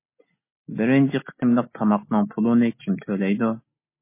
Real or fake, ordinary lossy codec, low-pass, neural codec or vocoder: real; MP3, 24 kbps; 3.6 kHz; none